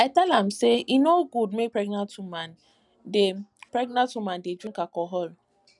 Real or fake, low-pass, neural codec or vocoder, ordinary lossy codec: real; 10.8 kHz; none; none